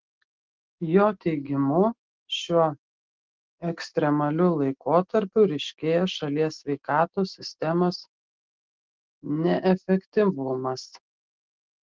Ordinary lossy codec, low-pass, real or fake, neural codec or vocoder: Opus, 16 kbps; 7.2 kHz; real; none